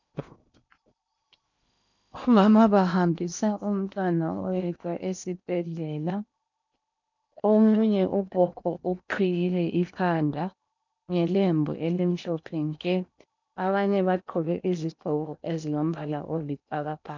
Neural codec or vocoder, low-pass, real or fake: codec, 16 kHz in and 24 kHz out, 0.8 kbps, FocalCodec, streaming, 65536 codes; 7.2 kHz; fake